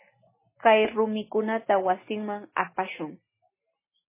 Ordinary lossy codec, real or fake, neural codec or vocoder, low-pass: MP3, 16 kbps; real; none; 3.6 kHz